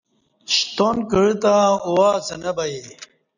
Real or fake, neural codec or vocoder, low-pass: real; none; 7.2 kHz